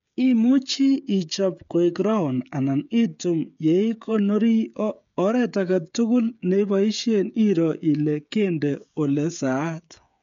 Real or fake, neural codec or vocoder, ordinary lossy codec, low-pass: fake; codec, 16 kHz, 16 kbps, FreqCodec, smaller model; MP3, 64 kbps; 7.2 kHz